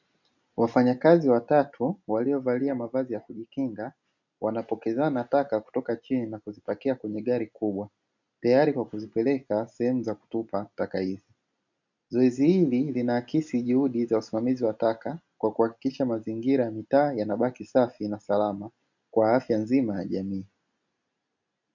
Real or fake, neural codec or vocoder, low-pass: real; none; 7.2 kHz